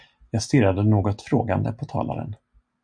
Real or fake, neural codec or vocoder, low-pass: real; none; 9.9 kHz